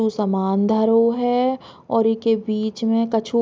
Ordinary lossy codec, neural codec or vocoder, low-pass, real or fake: none; none; none; real